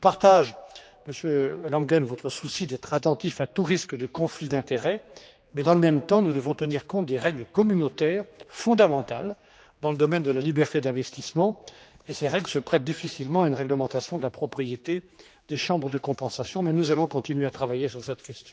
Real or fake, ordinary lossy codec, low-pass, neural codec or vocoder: fake; none; none; codec, 16 kHz, 2 kbps, X-Codec, HuBERT features, trained on general audio